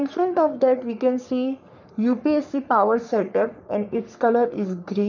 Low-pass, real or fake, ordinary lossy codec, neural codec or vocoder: 7.2 kHz; fake; none; codec, 44.1 kHz, 3.4 kbps, Pupu-Codec